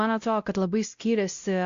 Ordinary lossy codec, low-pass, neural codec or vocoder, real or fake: Opus, 64 kbps; 7.2 kHz; codec, 16 kHz, 0.5 kbps, X-Codec, WavLM features, trained on Multilingual LibriSpeech; fake